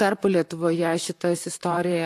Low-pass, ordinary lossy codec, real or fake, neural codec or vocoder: 14.4 kHz; AAC, 64 kbps; fake; vocoder, 44.1 kHz, 128 mel bands, Pupu-Vocoder